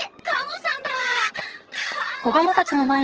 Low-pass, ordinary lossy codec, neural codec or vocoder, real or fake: 7.2 kHz; Opus, 16 kbps; vocoder, 22.05 kHz, 80 mel bands, HiFi-GAN; fake